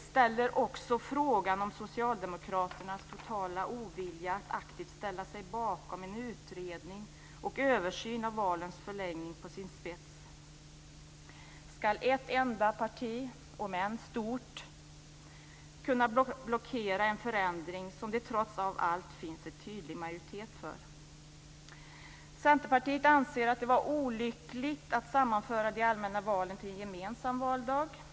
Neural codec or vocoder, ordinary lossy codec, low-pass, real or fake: none; none; none; real